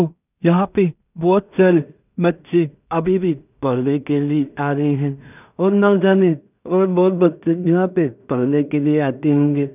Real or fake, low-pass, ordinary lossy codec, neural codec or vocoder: fake; 3.6 kHz; none; codec, 16 kHz in and 24 kHz out, 0.4 kbps, LongCat-Audio-Codec, two codebook decoder